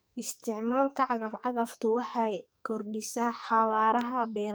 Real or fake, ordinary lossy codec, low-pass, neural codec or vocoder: fake; none; none; codec, 44.1 kHz, 2.6 kbps, SNAC